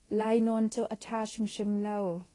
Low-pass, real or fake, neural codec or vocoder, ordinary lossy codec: 10.8 kHz; fake; codec, 24 kHz, 0.9 kbps, DualCodec; AAC, 32 kbps